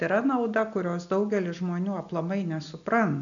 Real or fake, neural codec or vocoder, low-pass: real; none; 7.2 kHz